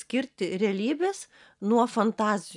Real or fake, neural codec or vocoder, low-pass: real; none; 10.8 kHz